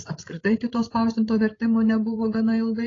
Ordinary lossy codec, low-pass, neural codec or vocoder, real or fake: AAC, 32 kbps; 7.2 kHz; codec, 16 kHz, 16 kbps, FreqCodec, larger model; fake